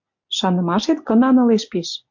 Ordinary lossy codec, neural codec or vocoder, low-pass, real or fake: MP3, 48 kbps; none; 7.2 kHz; real